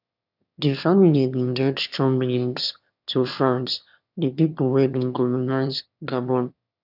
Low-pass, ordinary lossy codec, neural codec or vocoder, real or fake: 5.4 kHz; none; autoencoder, 22.05 kHz, a latent of 192 numbers a frame, VITS, trained on one speaker; fake